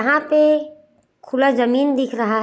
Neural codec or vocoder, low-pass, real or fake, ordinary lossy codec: none; none; real; none